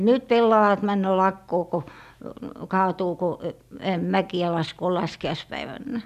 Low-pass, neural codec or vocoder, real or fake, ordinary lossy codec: 14.4 kHz; none; real; none